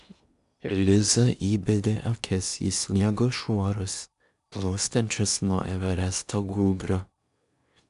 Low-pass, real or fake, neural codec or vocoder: 10.8 kHz; fake; codec, 16 kHz in and 24 kHz out, 0.8 kbps, FocalCodec, streaming, 65536 codes